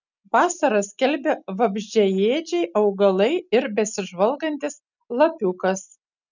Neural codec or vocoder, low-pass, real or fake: none; 7.2 kHz; real